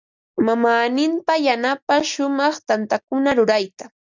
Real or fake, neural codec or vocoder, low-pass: real; none; 7.2 kHz